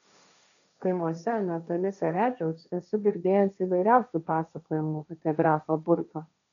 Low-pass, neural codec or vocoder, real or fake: 7.2 kHz; codec, 16 kHz, 1.1 kbps, Voila-Tokenizer; fake